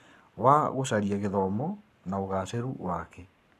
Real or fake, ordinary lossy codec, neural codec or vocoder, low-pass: fake; none; codec, 44.1 kHz, 7.8 kbps, Pupu-Codec; 14.4 kHz